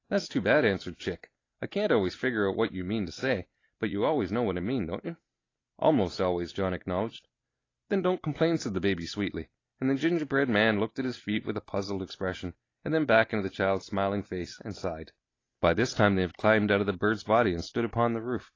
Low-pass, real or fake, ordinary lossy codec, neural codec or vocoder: 7.2 kHz; real; AAC, 32 kbps; none